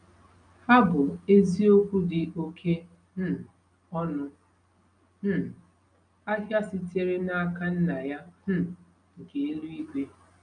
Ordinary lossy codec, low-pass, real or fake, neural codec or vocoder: none; 9.9 kHz; real; none